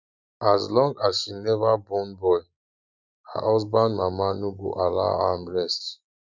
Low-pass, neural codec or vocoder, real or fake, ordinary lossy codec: 7.2 kHz; none; real; Opus, 64 kbps